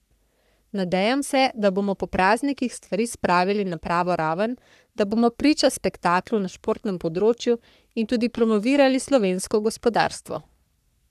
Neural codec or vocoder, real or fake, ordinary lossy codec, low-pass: codec, 44.1 kHz, 3.4 kbps, Pupu-Codec; fake; none; 14.4 kHz